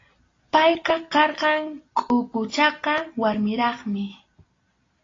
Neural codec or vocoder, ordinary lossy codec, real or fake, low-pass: none; AAC, 32 kbps; real; 7.2 kHz